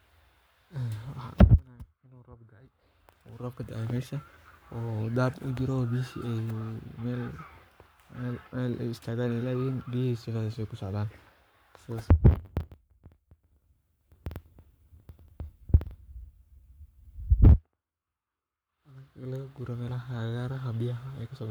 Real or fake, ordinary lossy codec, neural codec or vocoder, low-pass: fake; none; codec, 44.1 kHz, 7.8 kbps, Pupu-Codec; none